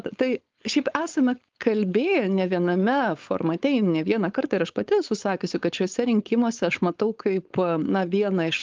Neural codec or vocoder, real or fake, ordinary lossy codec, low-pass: codec, 16 kHz, 4.8 kbps, FACodec; fake; Opus, 32 kbps; 7.2 kHz